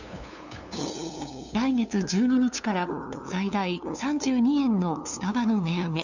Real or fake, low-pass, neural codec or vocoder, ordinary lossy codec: fake; 7.2 kHz; codec, 16 kHz, 2 kbps, FunCodec, trained on LibriTTS, 25 frames a second; none